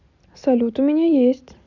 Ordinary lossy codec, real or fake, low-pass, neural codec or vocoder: none; real; 7.2 kHz; none